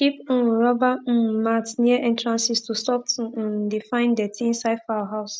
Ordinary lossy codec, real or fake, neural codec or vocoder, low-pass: none; real; none; none